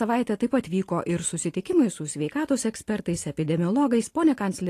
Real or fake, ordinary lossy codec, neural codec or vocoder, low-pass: real; AAC, 48 kbps; none; 14.4 kHz